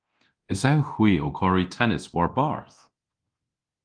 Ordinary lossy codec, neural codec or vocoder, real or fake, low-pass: Opus, 24 kbps; codec, 24 kHz, 0.9 kbps, DualCodec; fake; 9.9 kHz